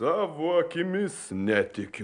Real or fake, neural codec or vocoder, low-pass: real; none; 9.9 kHz